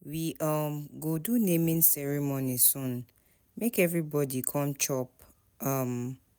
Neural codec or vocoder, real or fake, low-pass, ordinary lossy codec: none; real; none; none